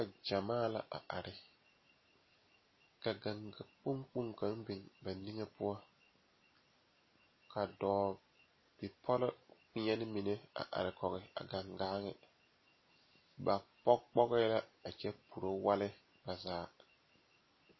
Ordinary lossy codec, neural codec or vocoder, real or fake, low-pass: MP3, 24 kbps; none; real; 7.2 kHz